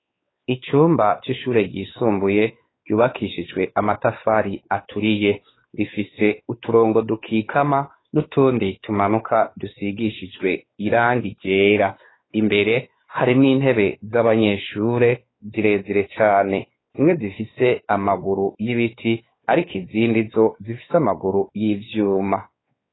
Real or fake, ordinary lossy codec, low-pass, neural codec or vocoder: fake; AAC, 16 kbps; 7.2 kHz; codec, 16 kHz, 4 kbps, X-Codec, WavLM features, trained on Multilingual LibriSpeech